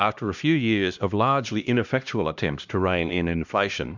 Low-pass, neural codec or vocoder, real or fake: 7.2 kHz; codec, 16 kHz, 1 kbps, X-Codec, HuBERT features, trained on LibriSpeech; fake